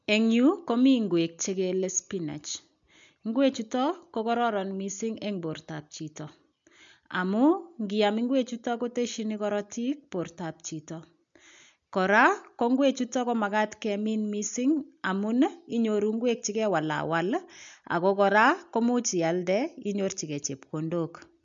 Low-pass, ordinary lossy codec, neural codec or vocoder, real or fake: 7.2 kHz; MP3, 64 kbps; none; real